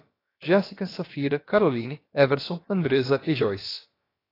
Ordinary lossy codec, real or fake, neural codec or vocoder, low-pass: AAC, 24 kbps; fake; codec, 16 kHz, about 1 kbps, DyCAST, with the encoder's durations; 5.4 kHz